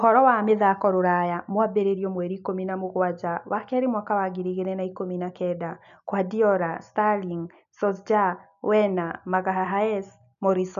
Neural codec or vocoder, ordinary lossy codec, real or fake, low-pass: none; none; real; 7.2 kHz